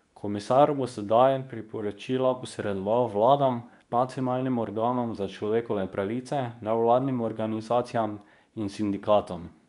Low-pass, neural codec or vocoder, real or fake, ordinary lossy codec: 10.8 kHz; codec, 24 kHz, 0.9 kbps, WavTokenizer, medium speech release version 2; fake; none